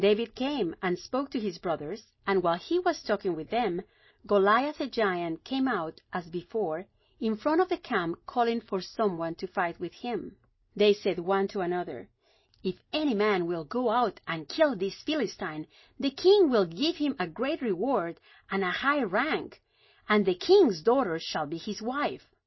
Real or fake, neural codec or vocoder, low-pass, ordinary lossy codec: real; none; 7.2 kHz; MP3, 24 kbps